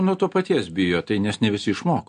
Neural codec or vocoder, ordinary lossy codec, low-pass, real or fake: none; MP3, 48 kbps; 9.9 kHz; real